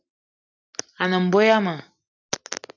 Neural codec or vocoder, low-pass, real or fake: none; 7.2 kHz; real